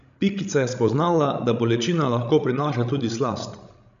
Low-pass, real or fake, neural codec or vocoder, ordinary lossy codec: 7.2 kHz; fake; codec, 16 kHz, 16 kbps, FreqCodec, larger model; none